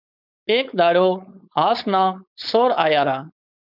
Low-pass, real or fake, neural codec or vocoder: 5.4 kHz; fake; codec, 16 kHz, 4.8 kbps, FACodec